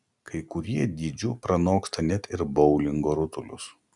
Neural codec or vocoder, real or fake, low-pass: none; real; 10.8 kHz